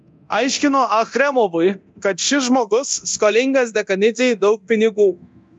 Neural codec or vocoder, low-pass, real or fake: codec, 24 kHz, 0.9 kbps, DualCodec; 10.8 kHz; fake